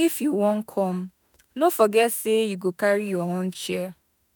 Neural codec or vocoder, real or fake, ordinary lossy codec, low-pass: autoencoder, 48 kHz, 32 numbers a frame, DAC-VAE, trained on Japanese speech; fake; none; none